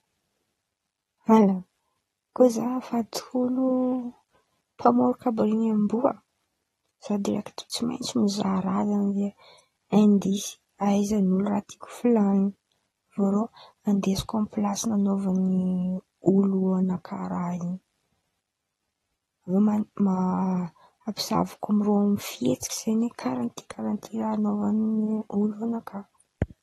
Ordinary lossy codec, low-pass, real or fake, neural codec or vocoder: AAC, 32 kbps; 19.8 kHz; real; none